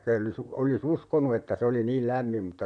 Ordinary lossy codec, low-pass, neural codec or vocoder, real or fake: none; 9.9 kHz; vocoder, 44.1 kHz, 128 mel bands, Pupu-Vocoder; fake